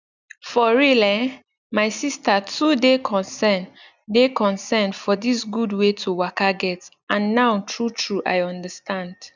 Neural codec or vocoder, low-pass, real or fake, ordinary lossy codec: none; 7.2 kHz; real; none